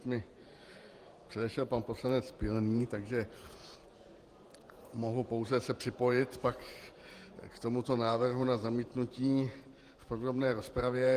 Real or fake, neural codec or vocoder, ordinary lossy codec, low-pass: fake; vocoder, 48 kHz, 128 mel bands, Vocos; Opus, 24 kbps; 14.4 kHz